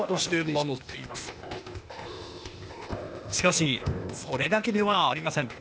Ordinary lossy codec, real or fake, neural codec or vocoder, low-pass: none; fake; codec, 16 kHz, 0.8 kbps, ZipCodec; none